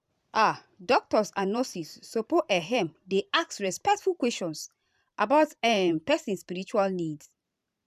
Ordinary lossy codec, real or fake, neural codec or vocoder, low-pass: none; fake; vocoder, 44.1 kHz, 128 mel bands every 512 samples, BigVGAN v2; 14.4 kHz